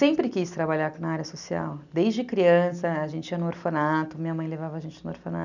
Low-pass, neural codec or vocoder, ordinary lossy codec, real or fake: 7.2 kHz; none; none; real